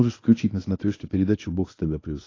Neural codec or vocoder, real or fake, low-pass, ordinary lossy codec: codec, 24 kHz, 0.9 kbps, WavTokenizer, medium speech release version 1; fake; 7.2 kHz; AAC, 32 kbps